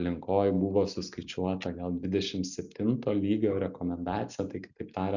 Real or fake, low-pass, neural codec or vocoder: real; 7.2 kHz; none